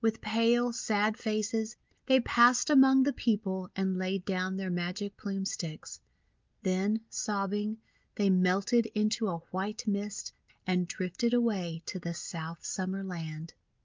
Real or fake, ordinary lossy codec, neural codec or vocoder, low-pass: real; Opus, 32 kbps; none; 7.2 kHz